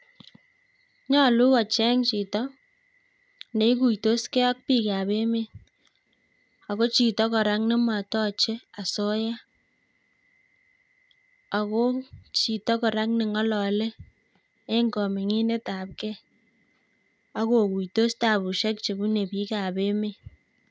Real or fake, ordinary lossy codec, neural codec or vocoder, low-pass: real; none; none; none